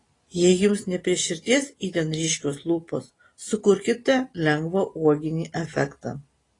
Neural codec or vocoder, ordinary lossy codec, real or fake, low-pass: none; AAC, 32 kbps; real; 10.8 kHz